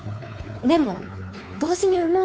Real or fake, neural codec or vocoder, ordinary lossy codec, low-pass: fake; codec, 16 kHz, 4 kbps, X-Codec, WavLM features, trained on Multilingual LibriSpeech; none; none